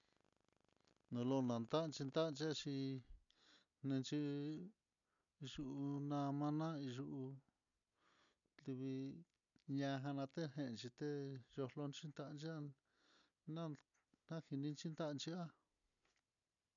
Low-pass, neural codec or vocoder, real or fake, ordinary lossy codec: 7.2 kHz; none; real; none